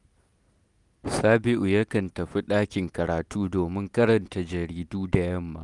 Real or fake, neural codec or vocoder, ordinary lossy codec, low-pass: real; none; none; 10.8 kHz